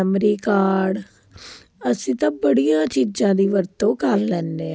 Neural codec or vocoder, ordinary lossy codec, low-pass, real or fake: none; none; none; real